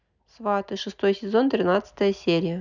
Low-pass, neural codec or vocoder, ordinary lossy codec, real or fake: 7.2 kHz; none; none; real